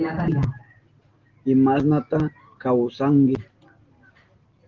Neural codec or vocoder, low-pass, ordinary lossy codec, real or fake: none; 7.2 kHz; Opus, 16 kbps; real